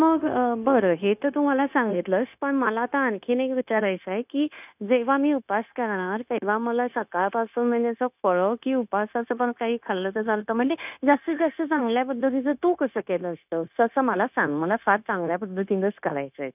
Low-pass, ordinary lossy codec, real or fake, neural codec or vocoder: 3.6 kHz; none; fake; codec, 16 kHz, 0.9 kbps, LongCat-Audio-Codec